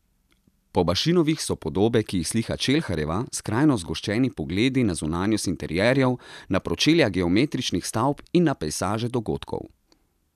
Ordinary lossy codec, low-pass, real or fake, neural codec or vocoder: none; 14.4 kHz; real; none